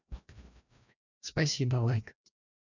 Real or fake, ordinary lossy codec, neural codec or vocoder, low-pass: fake; none; codec, 16 kHz, 1 kbps, FreqCodec, larger model; 7.2 kHz